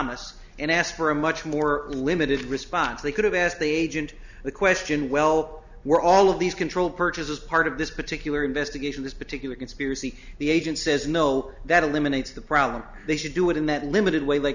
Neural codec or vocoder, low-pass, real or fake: none; 7.2 kHz; real